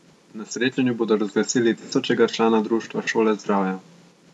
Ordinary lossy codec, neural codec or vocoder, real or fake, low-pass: none; none; real; none